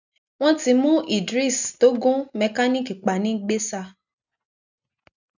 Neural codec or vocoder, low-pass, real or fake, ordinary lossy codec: none; 7.2 kHz; real; none